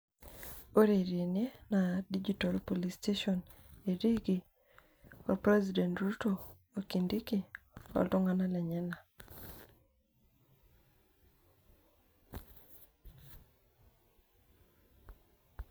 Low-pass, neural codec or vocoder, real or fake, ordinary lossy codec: none; none; real; none